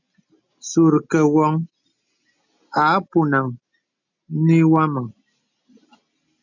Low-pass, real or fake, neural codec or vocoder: 7.2 kHz; real; none